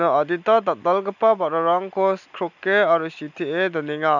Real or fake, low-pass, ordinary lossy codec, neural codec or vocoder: real; 7.2 kHz; none; none